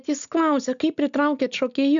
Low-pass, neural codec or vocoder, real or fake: 7.2 kHz; none; real